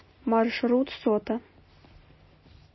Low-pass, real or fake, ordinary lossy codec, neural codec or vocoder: 7.2 kHz; real; MP3, 24 kbps; none